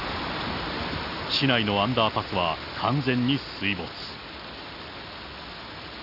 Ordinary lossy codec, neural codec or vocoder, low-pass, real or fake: none; none; 5.4 kHz; real